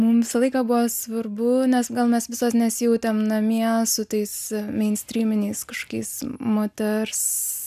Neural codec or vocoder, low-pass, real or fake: none; 14.4 kHz; real